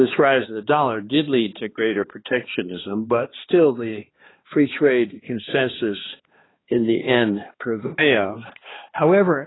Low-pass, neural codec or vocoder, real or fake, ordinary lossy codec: 7.2 kHz; codec, 16 kHz, 2 kbps, X-Codec, HuBERT features, trained on balanced general audio; fake; AAC, 16 kbps